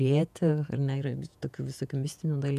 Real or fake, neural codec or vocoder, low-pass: fake; vocoder, 48 kHz, 128 mel bands, Vocos; 14.4 kHz